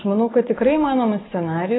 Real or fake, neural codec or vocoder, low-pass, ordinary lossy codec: real; none; 7.2 kHz; AAC, 16 kbps